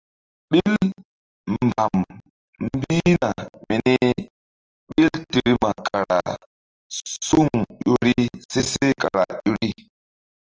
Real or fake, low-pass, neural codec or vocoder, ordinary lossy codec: real; 7.2 kHz; none; Opus, 24 kbps